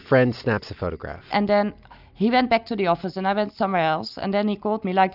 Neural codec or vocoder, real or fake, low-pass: none; real; 5.4 kHz